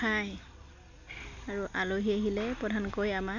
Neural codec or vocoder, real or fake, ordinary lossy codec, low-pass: none; real; none; 7.2 kHz